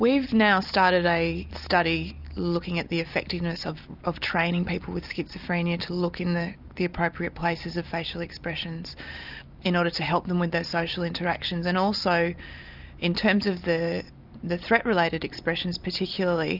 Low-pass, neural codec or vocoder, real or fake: 5.4 kHz; none; real